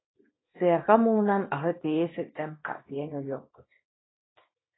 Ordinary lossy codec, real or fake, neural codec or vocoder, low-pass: AAC, 16 kbps; fake; codec, 24 kHz, 0.9 kbps, WavTokenizer, small release; 7.2 kHz